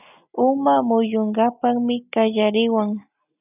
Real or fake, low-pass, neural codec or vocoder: real; 3.6 kHz; none